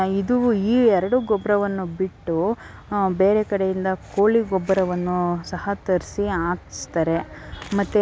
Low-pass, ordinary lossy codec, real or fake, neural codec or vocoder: none; none; real; none